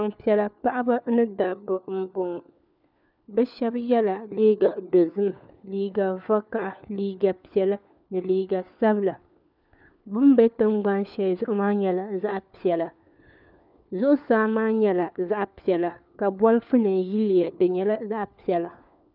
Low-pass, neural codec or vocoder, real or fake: 5.4 kHz; codec, 16 kHz, 4 kbps, FunCodec, trained on LibriTTS, 50 frames a second; fake